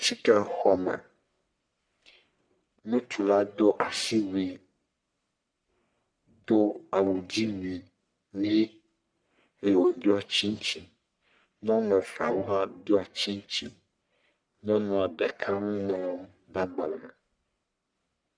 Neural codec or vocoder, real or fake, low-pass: codec, 44.1 kHz, 1.7 kbps, Pupu-Codec; fake; 9.9 kHz